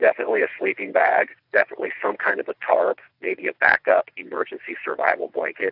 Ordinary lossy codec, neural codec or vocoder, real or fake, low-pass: AAC, 48 kbps; vocoder, 22.05 kHz, 80 mel bands, WaveNeXt; fake; 5.4 kHz